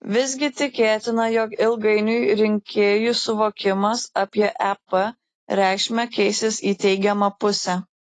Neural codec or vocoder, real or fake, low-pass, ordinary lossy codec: none; real; 10.8 kHz; AAC, 32 kbps